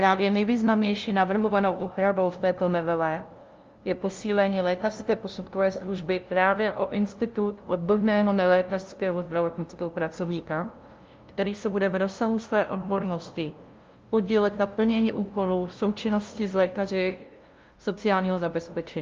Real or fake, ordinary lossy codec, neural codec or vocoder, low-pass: fake; Opus, 32 kbps; codec, 16 kHz, 0.5 kbps, FunCodec, trained on LibriTTS, 25 frames a second; 7.2 kHz